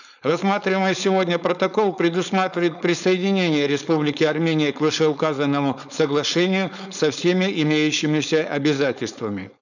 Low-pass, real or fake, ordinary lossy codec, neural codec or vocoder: 7.2 kHz; fake; none; codec, 16 kHz, 4.8 kbps, FACodec